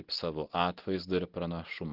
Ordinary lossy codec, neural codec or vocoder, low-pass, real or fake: Opus, 16 kbps; none; 5.4 kHz; real